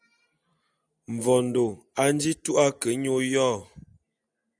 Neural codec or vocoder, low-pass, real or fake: none; 9.9 kHz; real